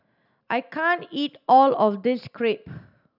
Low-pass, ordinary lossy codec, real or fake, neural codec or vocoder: 5.4 kHz; none; real; none